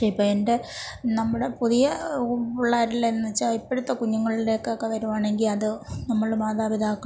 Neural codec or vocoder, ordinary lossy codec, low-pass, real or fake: none; none; none; real